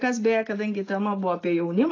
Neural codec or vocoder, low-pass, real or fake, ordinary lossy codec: none; 7.2 kHz; real; AAC, 32 kbps